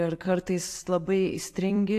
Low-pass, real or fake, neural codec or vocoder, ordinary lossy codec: 14.4 kHz; fake; vocoder, 44.1 kHz, 128 mel bands every 256 samples, BigVGAN v2; AAC, 64 kbps